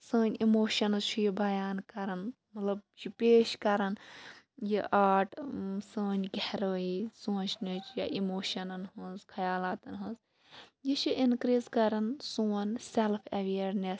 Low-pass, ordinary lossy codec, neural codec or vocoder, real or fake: none; none; none; real